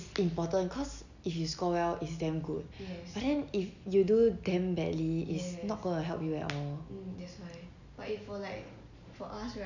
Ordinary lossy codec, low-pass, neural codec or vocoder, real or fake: none; 7.2 kHz; none; real